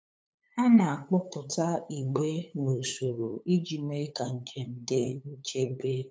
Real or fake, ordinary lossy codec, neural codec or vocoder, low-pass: fake; none; codec, 16 kHz, 8 kbps, FunCodec, trained on LibriTTS, 25 frames a second; none